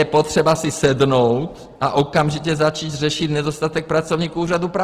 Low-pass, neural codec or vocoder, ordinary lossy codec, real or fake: 14.4 kHz; none; Opus, 16 kbps; real